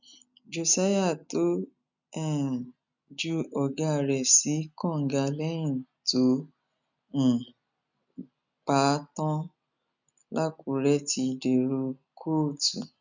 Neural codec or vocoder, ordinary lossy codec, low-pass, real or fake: none; none; 7.2 kHz; real